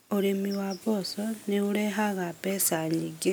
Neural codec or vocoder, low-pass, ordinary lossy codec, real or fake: none; none; none; real